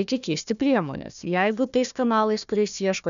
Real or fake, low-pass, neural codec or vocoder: fake; 7.2 kHz; codec, 16 kHz, 1 kbps, FunCodec, trained on Chinese and English, 50 frames a second